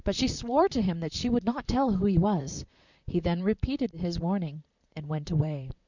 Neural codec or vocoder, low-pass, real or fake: vocoder, 44.1 kHz, 128 mel bands, Pupu-Vocoder; 7.2 kHz; fake